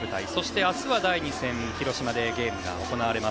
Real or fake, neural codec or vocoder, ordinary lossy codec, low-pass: real; none; none; none